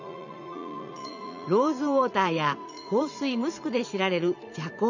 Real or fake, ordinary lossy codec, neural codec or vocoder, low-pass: fake; none; vocoder, 44.1 kHz, 80 mel bands, Vocos; 7.2 kHz